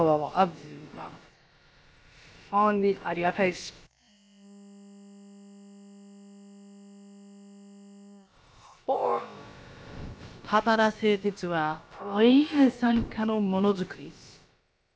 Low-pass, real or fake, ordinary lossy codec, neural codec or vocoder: none; fake; none; codec, 16 kHz, about 1 kbps, DyCAST, with the encoder's durations